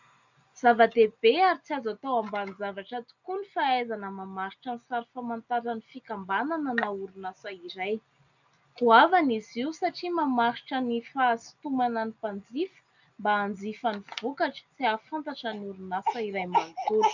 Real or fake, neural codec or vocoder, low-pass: real; none; 7.2 kHz